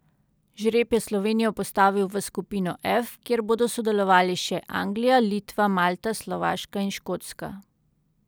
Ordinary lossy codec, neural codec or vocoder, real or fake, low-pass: none; none; real; none